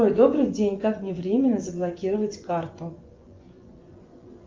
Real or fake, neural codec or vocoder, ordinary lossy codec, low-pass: fake; vocoder, 24 kHz, 100 mel bands, Vocos; Opus, 24 kbps; 7.2 kHz